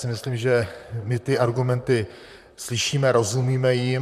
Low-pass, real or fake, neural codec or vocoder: 14.4 kHz; fake; vocoder, 44.1 kHz, 128 mel bands, Pupu-Vocoder